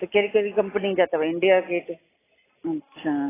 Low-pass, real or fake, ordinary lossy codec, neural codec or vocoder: 3.6 kHz; real; AAC, 16 kbps; none